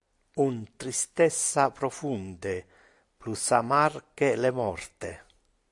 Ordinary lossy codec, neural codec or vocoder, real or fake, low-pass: AAC, 64 kbps; none; real; 10.8 kHz